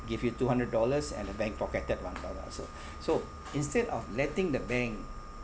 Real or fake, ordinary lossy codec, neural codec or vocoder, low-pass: real; none; none; none